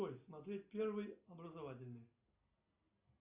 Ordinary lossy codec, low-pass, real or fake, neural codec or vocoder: Opus, 64 kbps; 3.6 kHz; real; none